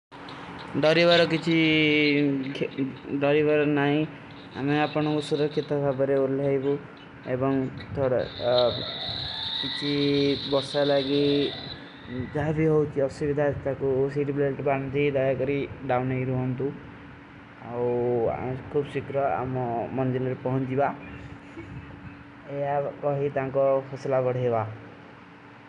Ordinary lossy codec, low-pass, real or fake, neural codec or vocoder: none; 10.8 kHz; real; none